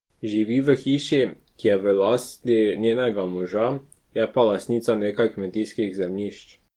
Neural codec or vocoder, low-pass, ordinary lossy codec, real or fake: codec, 44.1 kHz, 7.8 kbps, DAC; 19.8 kHz; Opus, 24 kbps; fake